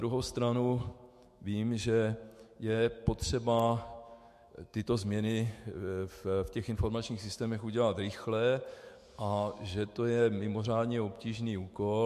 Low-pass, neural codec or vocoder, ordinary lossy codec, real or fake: 14.4 kHz; autoencoder, 48 kHz, 128 numbers a frame, DAC-VAE, trained on Japanese speech; MP3, 64 kbps; fake